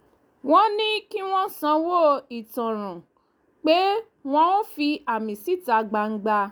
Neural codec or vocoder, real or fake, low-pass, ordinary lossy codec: none; real; none; none